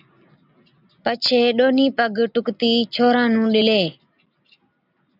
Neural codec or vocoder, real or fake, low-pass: none; real; 5.4 kHz